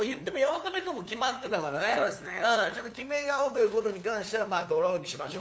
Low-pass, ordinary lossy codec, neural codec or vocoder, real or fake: none; none; codec, 16 kHz, 2 kbps, FunCodec, trained on LibriTTS, 25 frames a second; fake